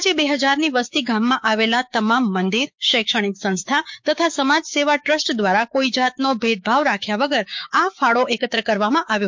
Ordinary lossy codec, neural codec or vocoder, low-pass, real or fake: MP3, 48 kbps; codec, 16 kHz, 6 kbps, DAC; 7.2 kHz; fake